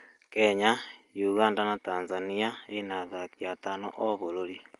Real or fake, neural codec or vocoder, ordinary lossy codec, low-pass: real; none; Opus, 32 kbps; 10.8 kHz